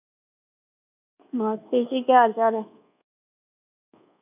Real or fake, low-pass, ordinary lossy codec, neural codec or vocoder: fake; 3.6 kHz; none; codec, 24 kHz, 1.2 kbps, DualCodec